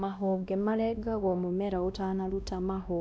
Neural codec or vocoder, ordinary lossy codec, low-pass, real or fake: codec, 16 kHz, 1 kbps, X-Codec, WavLM features, trained on Multilingual LibriSpeech; none; none; fake